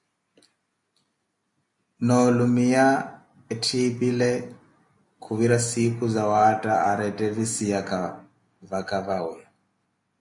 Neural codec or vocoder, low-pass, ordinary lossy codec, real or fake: none; 10.8 kHz; MP3, 64 kbps; real